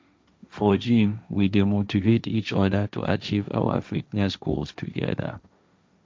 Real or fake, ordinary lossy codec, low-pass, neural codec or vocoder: fake; none; none; codec, 16 kHz, 1.1 kbps, Voila-Tokenizer